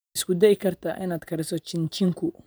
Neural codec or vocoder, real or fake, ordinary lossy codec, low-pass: vocoder, 44.1 kHz, 128 mel bands every 512 samples, BigVGAN v2; fake; none; none